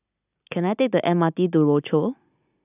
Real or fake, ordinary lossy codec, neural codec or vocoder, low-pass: real; none; none; 3.6 kHz